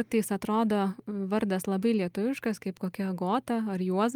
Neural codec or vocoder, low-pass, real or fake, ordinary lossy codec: none; 19.8 kHz; real; Opus, 32 kbps